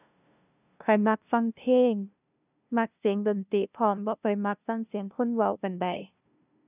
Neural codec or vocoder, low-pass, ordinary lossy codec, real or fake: codec, 16 kHz, 0.5 kbps, FunCodec, trained on LibriTTS, 25 frames a second; 3.6 kHz; none; fake